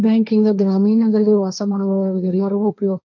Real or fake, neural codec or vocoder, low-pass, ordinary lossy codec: fake; codec, 16 kHz, 1.1 kbps, Voila-Tokenizer; 7.2 kHz; none